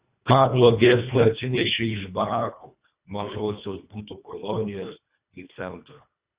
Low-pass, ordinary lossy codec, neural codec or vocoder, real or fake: 3.6 kHz; Opus, 64 kbps; codec, 24 kHz, 1.5 kbps, HILCodec; fake